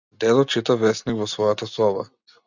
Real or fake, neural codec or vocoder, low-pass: real; none; 7.2 kHz